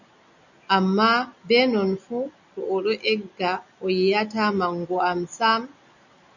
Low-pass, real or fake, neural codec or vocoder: 7.2 kHz; real; none